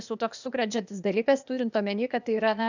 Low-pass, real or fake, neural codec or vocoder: 7.2 kHz; fake; codec, 16 kHz, 0.8 kbps, ZipCodec